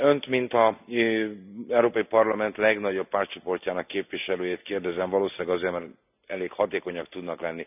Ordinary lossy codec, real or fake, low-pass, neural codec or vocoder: none; real; 3.6 kHz; none